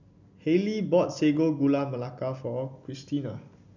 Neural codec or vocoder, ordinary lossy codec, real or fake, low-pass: none; none; real; 7.2 kHz